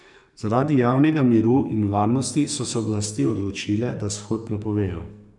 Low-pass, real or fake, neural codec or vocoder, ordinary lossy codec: 10.8 kHz; fake; codec, 44.1 kHz, 2.6 kbps, SNAC; none